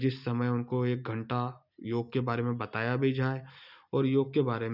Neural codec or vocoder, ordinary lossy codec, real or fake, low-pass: none; none; real; 5.4 kHz